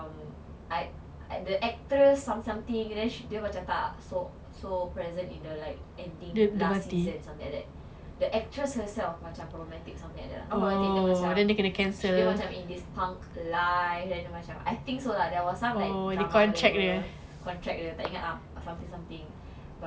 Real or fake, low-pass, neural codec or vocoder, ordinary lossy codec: real; none; none; none